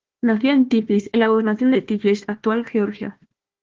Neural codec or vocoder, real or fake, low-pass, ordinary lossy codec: codec, 16 kHz, 1 kbps, FunCodec, trained on Chinese and English, 50 frames a second; fake; 7.2 kHz; Opus, 16 kbps